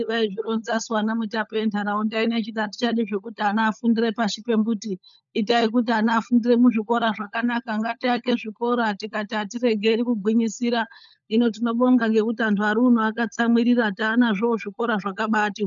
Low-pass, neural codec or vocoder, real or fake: 7.2 kHz; codec, 16 kHz, 16 kbps, FunCodec, trained on LibriTTS, 50 frames a second; fake